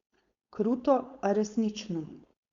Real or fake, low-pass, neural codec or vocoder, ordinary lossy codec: fake; 7.2 kHz; codec, 16 kHz, 4.8 kbps, FACodec; Opus, 64 kbps